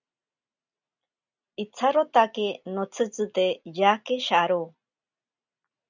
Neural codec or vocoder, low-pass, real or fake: none; 7.2 kHz; real